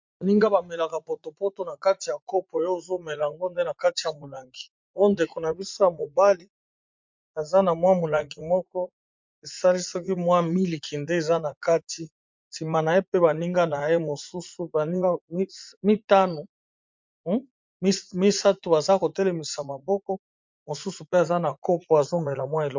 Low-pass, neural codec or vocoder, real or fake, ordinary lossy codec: 7.2 kHz; vocoder, 44.1 kHz, 80 mel bands, Vocos; fake; MP3, 64 kbps